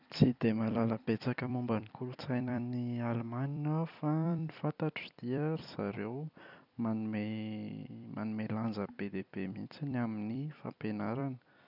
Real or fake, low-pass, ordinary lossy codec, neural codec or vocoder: fake; 5.4 kHz; none; vocoder, 44.1 kHz, 128 mel bands every 256 samples, BigVGAN v2